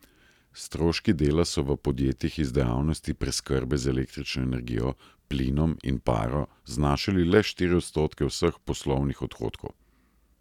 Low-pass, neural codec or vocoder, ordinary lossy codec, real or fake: 19.8 kHz; none; none; real